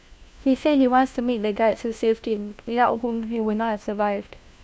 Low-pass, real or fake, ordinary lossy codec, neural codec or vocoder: none; fake; none; codec, 16 kHz, 1 kbps, FunCodec, trained on LibriTTS, 50 frames a second